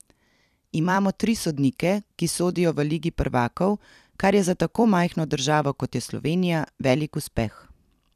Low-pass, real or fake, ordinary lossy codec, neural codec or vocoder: 14.4 kHz; fake; none; vocoder, 44.1 kHz, 128 mel bands every 256 samples, BigVGAN v2